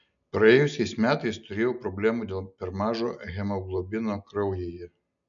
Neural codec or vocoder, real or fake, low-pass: none; real; 7.2 kHz